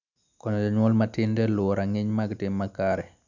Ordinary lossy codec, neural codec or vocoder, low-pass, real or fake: none; none; 7.2 kHz; real